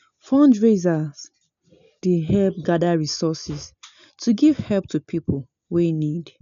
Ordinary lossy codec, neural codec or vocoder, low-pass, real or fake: none; none; 7.2 kHz; real